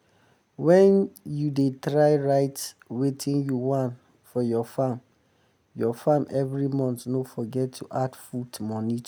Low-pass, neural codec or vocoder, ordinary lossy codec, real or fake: none; none; none; real